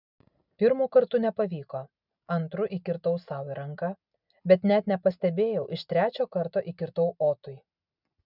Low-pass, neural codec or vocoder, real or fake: 5.4 kHz; none; real